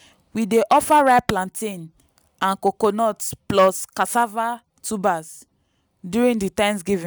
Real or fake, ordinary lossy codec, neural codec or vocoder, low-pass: real; none; none; none